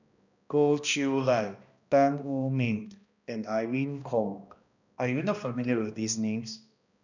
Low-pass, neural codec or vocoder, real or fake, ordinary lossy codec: 7.2 kHz; codec, 16 kHz, 1 kbps, X-Codec, HuBERT features, trained on balanced general audio; fake; none